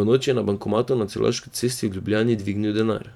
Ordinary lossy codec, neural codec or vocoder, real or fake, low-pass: none; vocoder, 44.1 kHz, 128 mel bands every 512 samples, BigVGAN v2; fake; 19.8 kHz